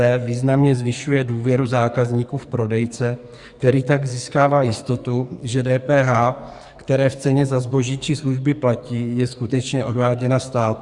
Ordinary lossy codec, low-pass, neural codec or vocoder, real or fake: Opus, 64 kbps; 10.8 kHz; codec, 44.1 kHz, 2.6 kbps, SNAC; fake